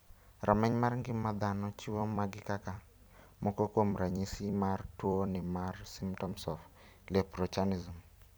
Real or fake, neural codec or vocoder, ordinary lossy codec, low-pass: fake; vocoder, 44.1 kHz, 128 mel bands every 256 samples, BigVGAN v2; none; none